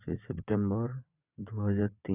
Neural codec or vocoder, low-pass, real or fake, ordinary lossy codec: vocoder, 22.05 kHz, 80 mel bands, WaveNeXt; 3.6 kHz; fake; none